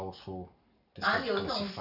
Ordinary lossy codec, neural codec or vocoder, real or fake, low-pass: none; none; real; 5.4 kHz